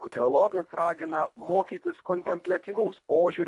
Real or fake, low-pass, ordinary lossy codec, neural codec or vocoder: fake; 10.8 kHz; AAC, 64 kbps; codec, 24 kHz, 1.5 kbps, HILCodec